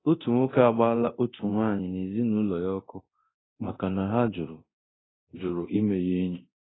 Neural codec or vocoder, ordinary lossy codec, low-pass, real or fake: codec, 24 kHz, 0.9 kbps, DualCodec; AAC, 16 kbps; 7.2 kHz; fake